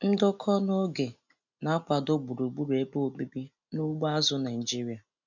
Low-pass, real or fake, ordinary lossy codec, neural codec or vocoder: 7.2 kHz; real; none; none